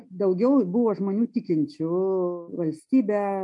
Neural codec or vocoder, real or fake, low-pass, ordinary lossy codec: none; real; 10.8 kHz; MP3, 48 kbps